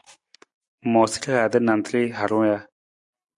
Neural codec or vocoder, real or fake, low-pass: none; real; 10.8 kHz